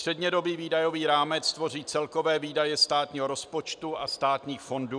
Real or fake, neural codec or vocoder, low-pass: real; none; 9.9 kHz